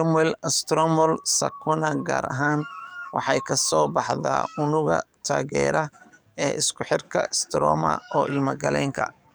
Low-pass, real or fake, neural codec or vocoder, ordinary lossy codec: none; fake; codec, 44.1 kHz, 7.8 kbps, DAC; none